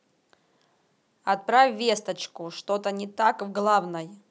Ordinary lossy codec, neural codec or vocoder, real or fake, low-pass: none; none; real; none